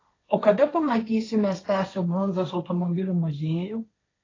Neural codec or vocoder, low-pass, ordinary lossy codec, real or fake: codec, 16 kHz, 1.1 kbps, Voila-Tokenizer; 7.2 kHz; AAC, 32 kbps; fake